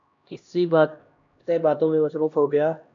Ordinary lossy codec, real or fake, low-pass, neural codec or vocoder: AAC, 64 kbps; fake; 7.2 kHz; codec, 16 kHz, 1 kbps, X-Codec, HuBERT features, trained on LibriSpeech